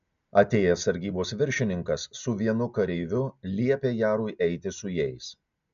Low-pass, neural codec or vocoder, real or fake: 7.2 kHz; none; real